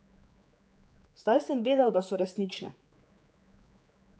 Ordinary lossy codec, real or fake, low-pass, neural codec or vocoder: none; fake; none; codec, 16 kHz, 4 kbps, X-Codec, HuBERT features, trained on general audio